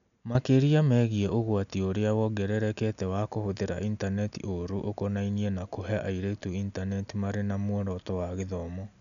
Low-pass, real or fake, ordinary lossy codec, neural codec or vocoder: 7.2 kHz; real; none; none